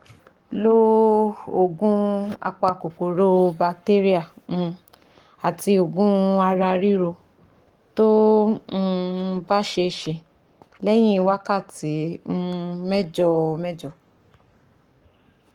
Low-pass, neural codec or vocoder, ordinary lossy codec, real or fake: 19.8 kHz; codec, 44.1 kHz, 7.8 kbps, Pupu-Codec; Opus, 24 kbps; fake